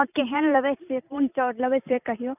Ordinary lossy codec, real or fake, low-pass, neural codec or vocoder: none; fake; 3.6 kHz; vocoder, 44.1 kHz, 128 mel bands every 256 samples, BigVGAN v2